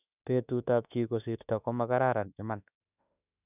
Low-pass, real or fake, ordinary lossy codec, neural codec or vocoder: 3.6 kHz; fake; none; autoencoder, 48 kHz, 32 numbers a frame, DAC-VAE, trained on Japanese speech